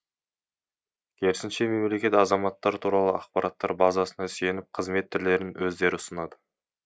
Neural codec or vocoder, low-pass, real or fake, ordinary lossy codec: none; none; real; none